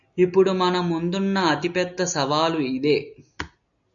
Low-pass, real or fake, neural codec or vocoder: 7.2 kHz; real; none